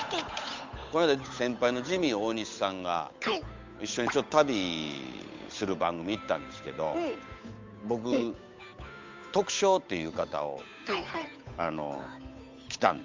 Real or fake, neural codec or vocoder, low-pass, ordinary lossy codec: fake; codec, 16 kHz, 8 kbps, FunCodec, trained on Chinese and English, 25 frames a second; 7.2 kHz; MP3, 64 kbps